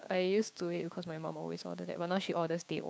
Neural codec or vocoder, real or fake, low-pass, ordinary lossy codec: codec, 16 kHz, 6 kbps, DAC; fake; none; none